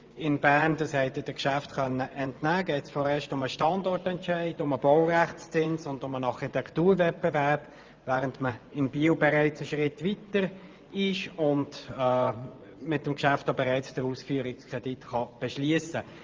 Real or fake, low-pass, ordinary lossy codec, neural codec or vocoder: fake; 7.2 kHz; Opus, 32 kbps; vocoder, 24 kHz, 100 mel bands, Vocos